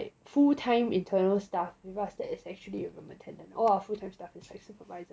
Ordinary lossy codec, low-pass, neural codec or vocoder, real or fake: none; none; none; real